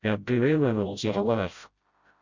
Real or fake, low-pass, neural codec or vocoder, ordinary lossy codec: fake; 7.2 kHz; codec, 16 kHz, 0.5 kbps, FreqCodec, smaller model; Opus, 64 kbps